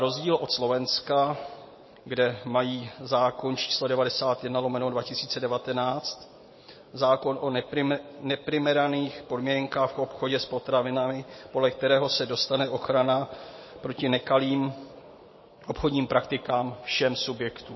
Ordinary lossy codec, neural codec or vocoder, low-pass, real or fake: MP3, 24 kbps; none; 7.2 kHz; real